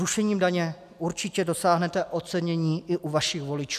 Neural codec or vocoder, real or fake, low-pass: none; real; 14.4 kHz